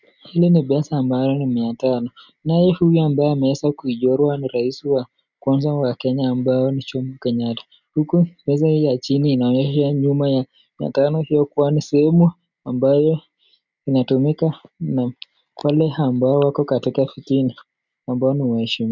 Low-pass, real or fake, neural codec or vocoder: 7.2 kHz; real; none